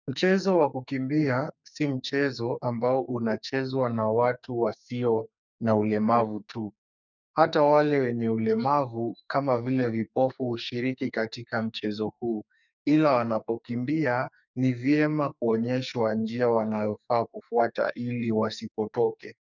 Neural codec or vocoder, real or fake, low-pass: codec, 44.1 kHz, 2.6 kbps, SNAC; fake; 7.2 kHz